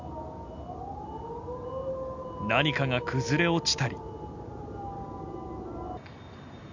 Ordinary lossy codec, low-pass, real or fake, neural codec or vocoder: Opus, 64 kbps; 7.2 kHz; real; none